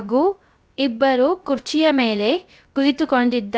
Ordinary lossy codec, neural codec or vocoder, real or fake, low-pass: none; codec, 16 kHz, 0.3 kbps, FocalCodec; fake; none